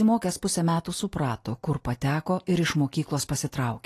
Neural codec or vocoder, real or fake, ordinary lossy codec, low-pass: none; real; AAC, 48 kbps; 14.4 kHz